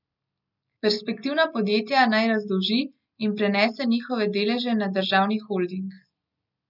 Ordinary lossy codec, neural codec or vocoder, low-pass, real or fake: none; none; 5.4 kHz; real